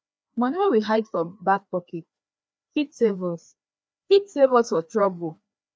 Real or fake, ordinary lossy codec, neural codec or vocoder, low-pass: fake; none; codec, 16 kHz, 2 kbps, FreqCodec, larger model; none